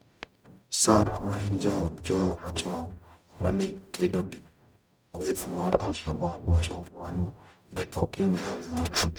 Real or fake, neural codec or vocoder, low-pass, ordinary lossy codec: fake; codec, 44.1 kHz, 0.9 kbps, DAC; none; none